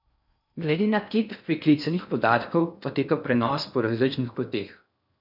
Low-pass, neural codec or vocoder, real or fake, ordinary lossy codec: 5.4 kHz; codec, 16 kHz in and 24 kHz out, 0.6 kbps, FocalCodec, streaming, 4096 codes; fake; none